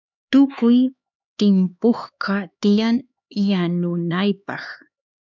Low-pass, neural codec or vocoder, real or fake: 7.2 kHz; codec, 16 kHz, 4 kbps, X-Codec, HuBERT features, trained on LibriSpeech; fake